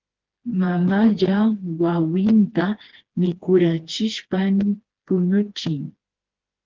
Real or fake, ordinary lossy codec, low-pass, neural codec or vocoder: fake; Opus, 16 kbps; 7.2 kHz; codec, 16 kHz, 2 kbps, FreqCodec, smaller model